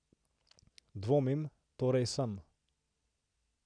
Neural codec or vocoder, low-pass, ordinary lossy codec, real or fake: none; 9.9 kHz; none; real